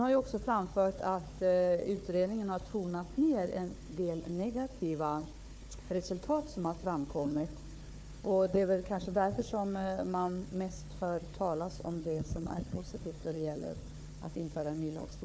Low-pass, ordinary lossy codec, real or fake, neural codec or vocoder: none; none; fake; codec, 16 kHz, 4 kbps, FunCodec, trained on Chinese and English, 50 frames a second